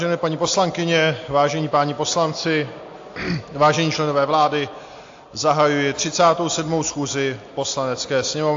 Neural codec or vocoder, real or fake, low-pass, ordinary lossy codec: none; real; 7.2 kHz; AAC, 48 kbps